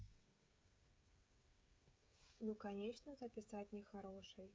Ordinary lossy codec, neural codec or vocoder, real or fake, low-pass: none; codec, 16 kHz, 16 kbps, FreqCodec, smaller model; fake; none